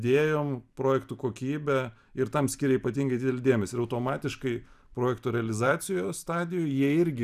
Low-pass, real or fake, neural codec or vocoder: 14.4 kHz; fake; vocoder, 44.1 kHz, 128 mel bands every 256 samples, BigVGAN v2